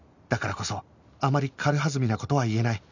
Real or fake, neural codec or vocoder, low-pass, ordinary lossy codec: real; none; 7.2 kHz; none